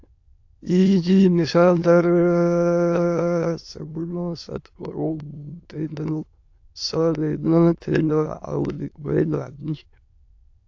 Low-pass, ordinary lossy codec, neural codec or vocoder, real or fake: 7.2 kHz; AAC, 48 kbps; autoencoder, 22.05 kHz, a latent of 192 numbers a frame, VITS, trained on many speakers; fake